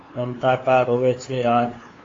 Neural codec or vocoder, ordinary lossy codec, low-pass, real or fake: codec, 16 kHz, 2 kbps, FunCodec, trained on LibriTTS, 25 frames a second; AAC, 32 kbps; 7.2 kHz; fake